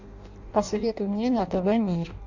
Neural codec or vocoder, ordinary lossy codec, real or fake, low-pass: codec, 16 kHz in and 24 kHz out, 0.6 kbps, FireRedTTS-2 codec; AAC, 48 kbps; fake; 7.2 kHz